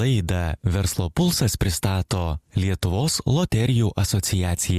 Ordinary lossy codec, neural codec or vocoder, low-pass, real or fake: Opus, 64 kbps; none; 14.4 kHz; real